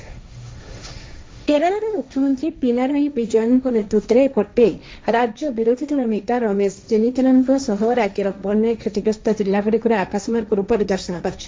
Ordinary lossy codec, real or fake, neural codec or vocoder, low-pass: none; fake; codec, 16 kHz, 1.1 kbps, Voila-Tokenizer; none